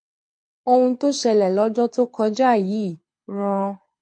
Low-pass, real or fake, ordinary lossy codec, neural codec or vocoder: 9.9 kHz; fake; MP3, 48 kbps; codec, 24 kHz, 6 kbps, HILCodec